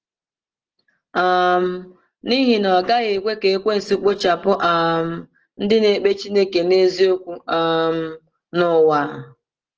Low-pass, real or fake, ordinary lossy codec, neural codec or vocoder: 7.2 kHz; real; Opus, 16 kbps; none